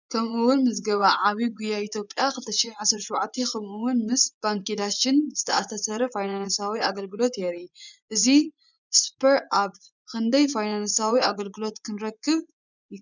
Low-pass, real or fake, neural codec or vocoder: 7.2 kHz; fake; vocoder, 24 kHz, 100 mel bands, Vocos